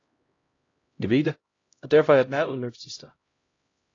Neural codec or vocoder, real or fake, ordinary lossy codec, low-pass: codec, 16 kHz, 0.5 kbps, X-Codec, HuBERT features, trained on LibriSpeech; fake; AAC, 32 kbps; 7.2 kHz